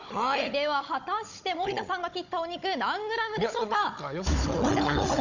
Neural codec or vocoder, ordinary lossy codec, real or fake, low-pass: codec, 16 kHz, 16 kbps, FunCodec, trained on Chinese and English, 50 frames a second; none; fake; 7.2 kHz